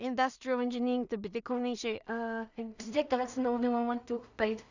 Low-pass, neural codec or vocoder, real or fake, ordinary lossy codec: 7.2 kHz; codec, 16 kHz in and 24 kHz out, 0.4 kbps, LongCat-Audio-Codec, two codebook decoder; fake; none